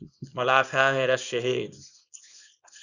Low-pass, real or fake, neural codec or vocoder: 7.2 kHz; fake; codec, 24 kHz, 0.9 kbps, WavTokenizer, small release